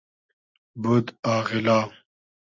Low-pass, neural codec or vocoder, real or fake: 7.2 kHz; none; real